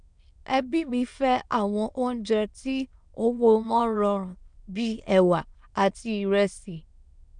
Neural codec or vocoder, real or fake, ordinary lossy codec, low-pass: autoencoder, 22.05 kHz, a latent of 192 numbers a frame, VITS, trained on many speakers; fake; MP3, 96 kbps; 9.9 kHz